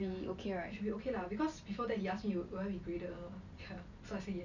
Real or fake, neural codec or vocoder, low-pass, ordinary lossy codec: real; none; 7.2 kHz; none